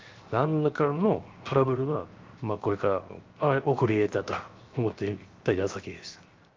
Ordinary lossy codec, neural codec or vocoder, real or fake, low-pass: Opus, 32 kbps; codec, 16 kHz, 0.7 kbps, FocalCodec; fake; 7.2 kHz